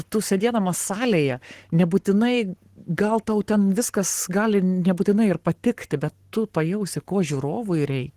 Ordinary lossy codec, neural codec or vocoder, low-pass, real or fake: Opus, 16 kbps; codec, 44.1 kHz, 7.8 kbps, Pupu-Codec; 14.4 kHz; fake